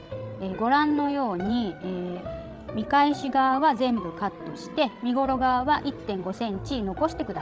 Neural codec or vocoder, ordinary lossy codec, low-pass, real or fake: codec, 16 kHz, 16 kbps, FreqCodec, larger model; none; none; fake